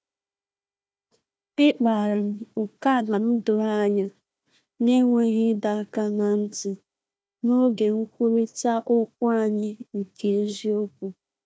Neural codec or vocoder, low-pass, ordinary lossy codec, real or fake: codec, 16 kHz, 1 kbps, FunCodec, trained on Chinese and English, 50 frames a second; none; none; fake